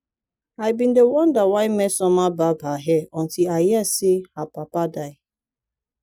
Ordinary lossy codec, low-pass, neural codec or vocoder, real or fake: none; 19.8 kHz; none; real